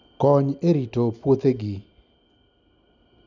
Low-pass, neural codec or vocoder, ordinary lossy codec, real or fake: 7.2 kHz; none; none; real